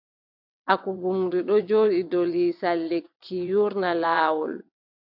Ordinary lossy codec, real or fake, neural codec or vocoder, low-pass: AAC, 48 kbps; fake; vocoder, 22.05 kHz, 80 mel bands, WaveNeXt; 5.4 kHz